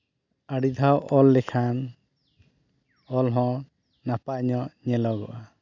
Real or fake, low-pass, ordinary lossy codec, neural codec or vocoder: real; 7.2 kHz; none; none